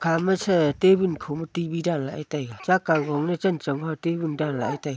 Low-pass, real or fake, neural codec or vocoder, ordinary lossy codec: none; real; none; none